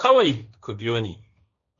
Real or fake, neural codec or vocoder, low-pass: fake; codec, 16 kHz, 1.1 kbps, Voila-Tokenizer; 7.2 kHz